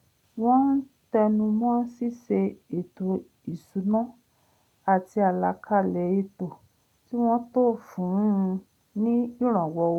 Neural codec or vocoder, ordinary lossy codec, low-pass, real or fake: none; none; 19.8 kHz; real